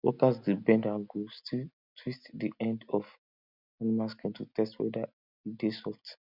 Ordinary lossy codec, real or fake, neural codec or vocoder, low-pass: none; real; none; 5.4 kHz